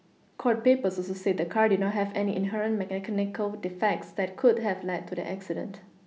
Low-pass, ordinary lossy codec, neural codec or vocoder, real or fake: none; none; none; real